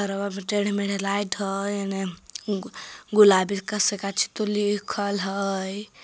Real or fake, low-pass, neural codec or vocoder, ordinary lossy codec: real; none; none; none